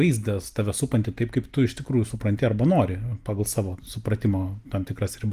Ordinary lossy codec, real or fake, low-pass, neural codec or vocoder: Opus, 24 kbps; real; 14.4 kHz; none